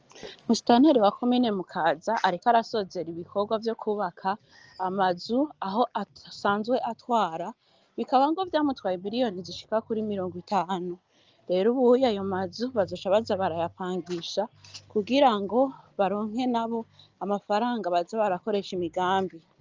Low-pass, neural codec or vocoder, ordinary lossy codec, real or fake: 7.2 kHz; none; Opus, 24 kbps; real